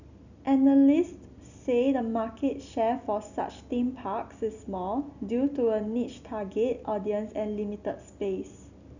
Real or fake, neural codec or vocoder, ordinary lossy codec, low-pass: real; none; none; 7.2 kHz